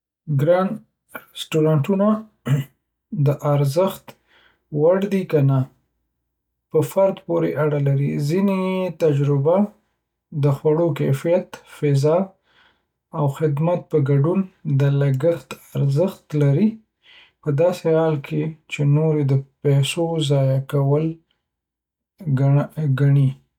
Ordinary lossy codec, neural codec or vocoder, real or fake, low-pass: none; none; real; 19.8 kHz